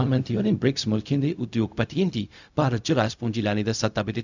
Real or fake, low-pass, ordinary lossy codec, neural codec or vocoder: fake; 7.2 kHz; none; codec, 16 kHz, 0.4 kbps, LongCat-Audio-Codec